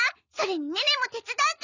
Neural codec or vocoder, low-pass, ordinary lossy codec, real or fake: none; 7.2 kHz; none; real